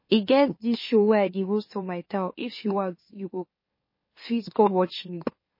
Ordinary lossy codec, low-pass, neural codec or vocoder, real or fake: MP3, 24 kbps; 5.4 kHz; autoencoder, 44.1 kHz, a latent of 192 numbers a frame, MeloTTS; fake